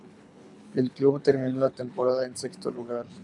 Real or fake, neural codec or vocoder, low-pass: fake; codec, 24 kHz, 3 kbps, HILCodec; 10.8 kHz